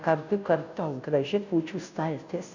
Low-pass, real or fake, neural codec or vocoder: 7.2 kHz; fake; codec, 16 kHz, 0.5 kbps, FunCodec, trained on Chinese and English, 25 frames a second